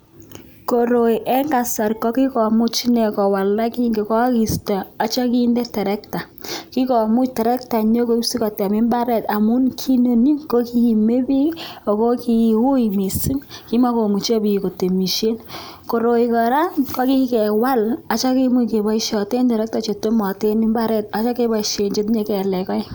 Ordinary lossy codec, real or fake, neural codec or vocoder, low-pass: none; real; none; none